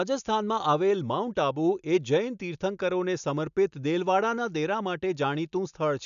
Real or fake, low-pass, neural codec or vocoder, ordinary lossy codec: real; 7.2 kHz; none; none